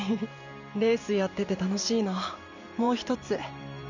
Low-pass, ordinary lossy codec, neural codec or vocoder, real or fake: 7.2 kHz; none; vocoder, 44.1 kHz, 128 mel bands every 512 samples, BigVGAN v2; fake